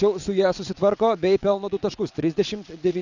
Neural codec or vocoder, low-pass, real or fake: vocoder, 22.05 kHz, 80 mel bands, WaveNeXt; 7.2 kHz; fake